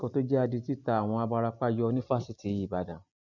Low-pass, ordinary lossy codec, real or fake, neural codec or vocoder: 7.2 kHz; none; real; none